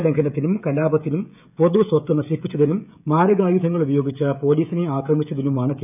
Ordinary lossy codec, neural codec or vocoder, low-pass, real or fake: none; codec, 16 kHz, 16 kbps, FreqCodec, smaller model; 3.6 kHz; fake